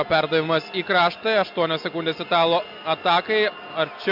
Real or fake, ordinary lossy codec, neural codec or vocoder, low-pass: real; MP3, 48 kbps; none; 5.4 kHz